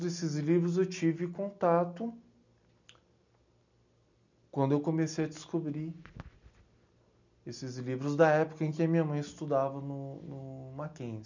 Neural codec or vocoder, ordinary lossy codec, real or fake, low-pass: none; none; real; 7.2 kHz